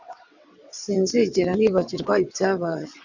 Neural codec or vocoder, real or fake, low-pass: vocoder, 22.05 kHz, 80 mel bands, WaveNeXt; fake; 7.2 kHz